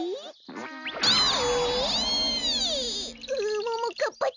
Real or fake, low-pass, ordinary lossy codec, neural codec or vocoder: real; 7.2 kHz; none; none